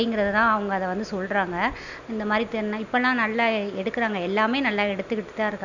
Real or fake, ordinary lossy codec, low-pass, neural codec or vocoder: real; none; 7.2 kHz; none